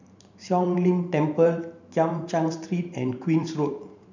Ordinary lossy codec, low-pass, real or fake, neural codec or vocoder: none; 7.2 kHz; fake; vocoder, 44.1 kHz, 128 mel bands every 512 samples, BigVGAN v2